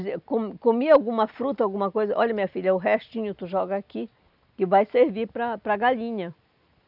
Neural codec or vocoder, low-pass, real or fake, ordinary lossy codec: none; 5.4 kHz; real; none